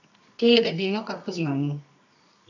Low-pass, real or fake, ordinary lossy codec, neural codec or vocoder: 7.2 kHz; fake; none; codec, 24 kHz, 0.9 kbps, WavTokenizer, medium music audio release